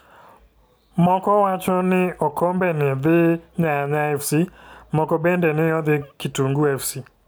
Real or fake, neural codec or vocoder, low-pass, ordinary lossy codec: real; none; none; none